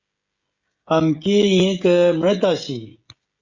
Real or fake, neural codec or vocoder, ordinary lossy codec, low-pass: fake; codec, 16 kHz, 16 kbps, FreqCodec, smaller model; Opus, 64 kbps; 7.2 kHz